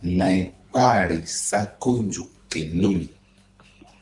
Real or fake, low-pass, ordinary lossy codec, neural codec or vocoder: fake; 10.8 kHz; MP3, 96 kbps; codec, 24 kHz, 3 kbps, HILCodec